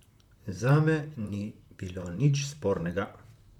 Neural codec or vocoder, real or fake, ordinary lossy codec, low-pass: vocoder, 44.1 kHz, 128 mel bands, Pupu-Vocoder; fake; none; 19.8 kHz